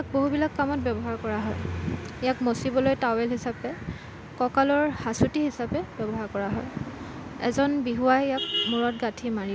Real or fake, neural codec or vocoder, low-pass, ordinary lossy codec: real; none; none; none